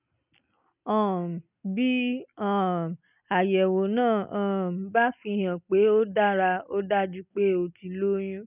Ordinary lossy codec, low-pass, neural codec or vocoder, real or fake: none; 3.6 kHz; none; real